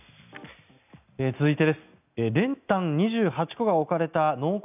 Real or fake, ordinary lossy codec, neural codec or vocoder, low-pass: real; none; none; 3.6 kHz